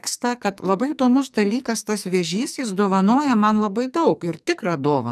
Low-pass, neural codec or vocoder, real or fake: 14.4 kHz; codec, 44.1 kHz, 2.6 kbps, SNAC; fake